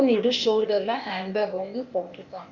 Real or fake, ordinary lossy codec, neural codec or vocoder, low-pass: fake; Opus, 64 kbps; codec, 16 kHz, 0.8 kbps, ZipCodec; 7.2 kHz